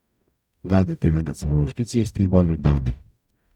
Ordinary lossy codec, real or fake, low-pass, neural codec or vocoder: none; fake; 19.8 kHz; codec, 44.1 kHz, 0.9 kbps, DAC